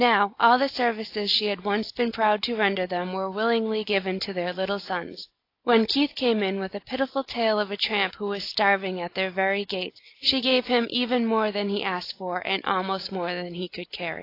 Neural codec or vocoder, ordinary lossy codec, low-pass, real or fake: none; AAC, 32 kbps; 5.4 kHz; real